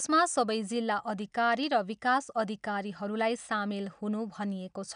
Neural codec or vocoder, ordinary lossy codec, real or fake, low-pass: none; none; real; 9.9 kHz